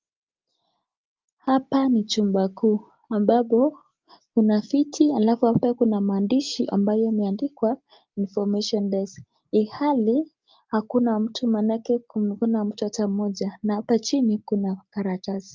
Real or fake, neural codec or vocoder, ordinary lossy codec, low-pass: real; none; Opus, 24 kbps; 7.2 kHz